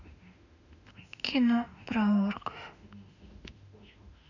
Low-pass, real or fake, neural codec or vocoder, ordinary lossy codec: 7.2 kHz; fake; autoencoder, 48 kHz, 32 numbers a frame, DAC-VAE, trained on Japanese speech; none